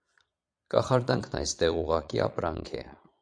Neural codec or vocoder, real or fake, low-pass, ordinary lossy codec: vocoder, 22.05 kHz, 80 mel bands, Vocos; fake; 9.9 kHz; MP3, 64 kbps